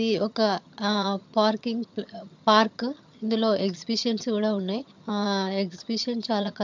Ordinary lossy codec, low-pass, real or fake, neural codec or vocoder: MP3, 64 kbps; 7.2 kHz; fake; vocoder, 22.05 kHz, 80 mel bands, HiFi-GAN